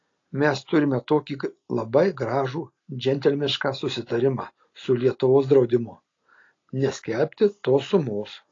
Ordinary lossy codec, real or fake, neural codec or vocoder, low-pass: AAC, 32 kbps; real; none; 7.2 kHz